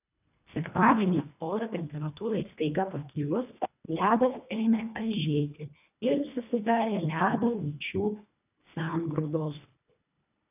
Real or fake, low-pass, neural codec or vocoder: fake; 3.6 kHz; codec, 24 kHz, 1.5 kbps, HILCodec